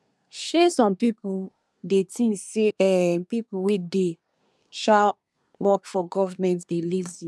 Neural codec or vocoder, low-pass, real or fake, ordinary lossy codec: codec, 24 kHz, 1 kbps, SNAC; none; fake; none